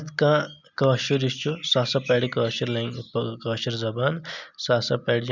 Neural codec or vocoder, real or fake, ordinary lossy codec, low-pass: none; real; none; 7.2 kHz